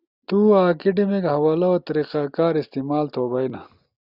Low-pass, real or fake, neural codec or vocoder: 5.4 kHz; real; none